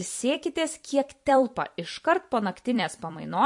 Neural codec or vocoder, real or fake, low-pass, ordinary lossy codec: vocoder, 44.1 kHz, 128 mel bands every 256 samples, BigVGAN v2; fake; 10.8 kHz; MP3, 48 kbps